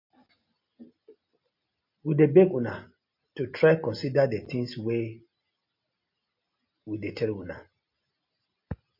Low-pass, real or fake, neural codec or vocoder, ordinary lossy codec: 5.4 kHz; real; none; MP3, 32 kbps